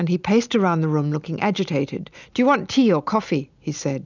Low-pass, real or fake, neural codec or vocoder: 7.2 kHz; real; none